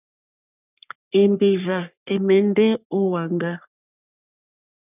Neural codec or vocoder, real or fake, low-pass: codec, 44.1 kHz, 3.4 kbps, Pupu-Codec; fake; 3.6 kHz